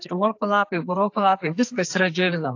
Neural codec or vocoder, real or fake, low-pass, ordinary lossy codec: codec, 44.1 kHz, 2.6 kbps, SNAC; fake; 7.2 kHz; AAC, 48 kbps